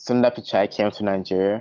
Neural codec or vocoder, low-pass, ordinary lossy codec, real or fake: none; 7.2 kHz; Opus, 32 kbps; real